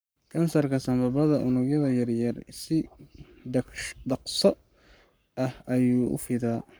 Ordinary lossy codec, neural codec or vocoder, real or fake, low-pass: none; codec, 44.1 kHz, 7.8 kbps, Pupu-Codec; fake; none